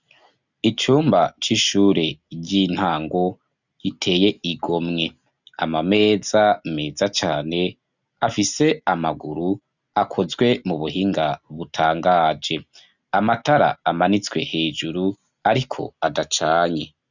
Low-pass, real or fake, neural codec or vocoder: 7.2 kHz; real; none